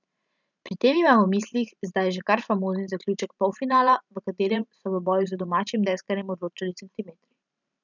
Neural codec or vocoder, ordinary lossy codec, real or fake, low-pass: vocoder, 44.1 kHz, 128 mel bands every 512 samples, BigVGAN v2; none; fake; 7.2 kHz